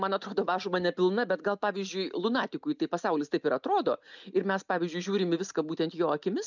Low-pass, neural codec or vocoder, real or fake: 7.2 kHz; none; real